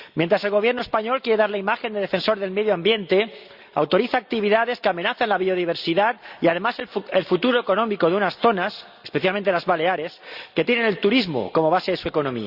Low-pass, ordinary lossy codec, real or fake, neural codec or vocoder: 5.4 kHz; Opus, 64 kbps; real; none